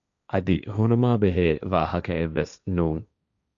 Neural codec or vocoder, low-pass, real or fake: codec, 16 kHz, 1.1 kbps, Voila-Tokenizer; 7.2 kHz; fake